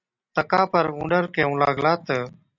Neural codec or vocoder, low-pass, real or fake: none; 7.2 kHz; real